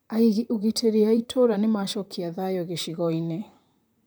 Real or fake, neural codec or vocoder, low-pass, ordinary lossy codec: fake; vocoder, 44.1 kHz, 128 mel bands, Pupu-Vocoder; none; none